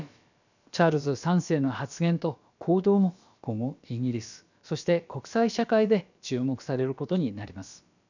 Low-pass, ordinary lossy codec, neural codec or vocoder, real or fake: 7.2 kHz; none; codec, 16 kHz, about 1 kbps, DyCAST, with the encoder's durations; fake